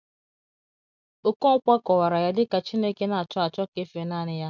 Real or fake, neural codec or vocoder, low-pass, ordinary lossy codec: real; none; 7.2 kHz; none